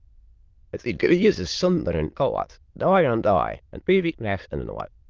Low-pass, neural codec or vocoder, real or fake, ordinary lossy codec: 7.2 kHz; autoencoder, 22.05 kHz, a latent of 192 numbers a frame, VITS, trained on many speakers; fake; Opus, 32 kbps